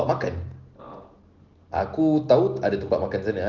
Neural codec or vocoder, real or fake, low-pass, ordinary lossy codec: none; real; 7.2 kHz; Opus, 16 kbps